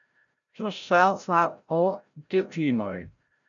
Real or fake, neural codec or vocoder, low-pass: fake; codec, 16 kHz, 0.5 kbps, FreqCodec, larger model; 7.2 kHz